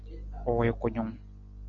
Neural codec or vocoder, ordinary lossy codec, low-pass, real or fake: none; MP3, 48 kbps; 7.2 kHz; real